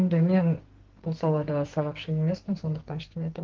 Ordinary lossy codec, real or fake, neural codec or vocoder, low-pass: Opus, 16 kbps; fake; codec, 44.1 kHz, 2.6 kbps, SNAC; 7.2 kHz